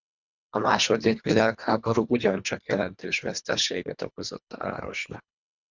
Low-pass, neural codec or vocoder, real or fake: 7.2 kHz; codec, 24 kHz, 1.5 kbps, HILCodec; fake